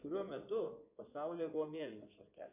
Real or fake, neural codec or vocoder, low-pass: fake; codec, 16 kHz in and 24 kHz out, 2.2 kbps, FireRedTTS-2 codec; 3.6 kHz